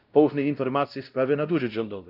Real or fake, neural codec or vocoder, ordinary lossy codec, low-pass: fake; codec, 16 kHz, 0.5 kbps, X-Codec, WavLM features, trained on Multilingual LibriSpeech; Opus, 24 kbps; 5.4 kHz